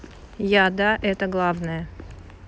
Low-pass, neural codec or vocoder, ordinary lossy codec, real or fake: none; none; none; real